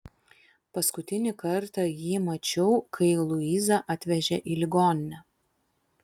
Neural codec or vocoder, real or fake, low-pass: none; real; 19.8 kHz